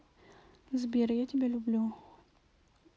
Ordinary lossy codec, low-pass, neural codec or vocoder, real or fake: none; none; none; real